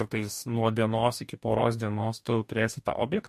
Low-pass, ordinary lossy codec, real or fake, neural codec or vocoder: 14.4 kHz; MP3, 64 kbps; fake; codec, 44.1 kHz, 2.6 kbps, DAC